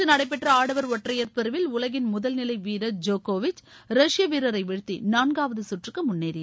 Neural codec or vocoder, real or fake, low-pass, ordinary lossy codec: none; real; none; none